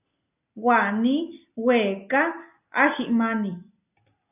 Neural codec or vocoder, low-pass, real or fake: none; 3.6 kHz; real